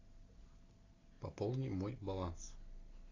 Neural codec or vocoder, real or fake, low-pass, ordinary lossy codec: none; real; 7.2 kHz; AAC, 32 kbps